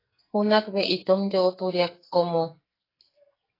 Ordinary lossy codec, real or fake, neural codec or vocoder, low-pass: AAC, 24 kbps; fake; codec, 44.1 kHz, 2.6 kbps, SNAC; 5.4 kHz